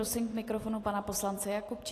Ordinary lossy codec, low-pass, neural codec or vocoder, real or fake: AAC, 48 kbps; 14.4 kHz; none; real